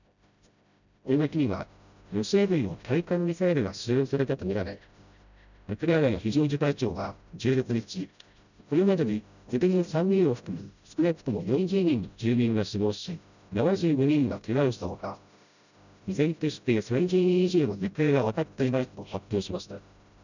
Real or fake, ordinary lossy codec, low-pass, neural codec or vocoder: fake; none; 7.2 kHz; codec, 16 kHz, 0.5 kbps, FreqCodec, smaller model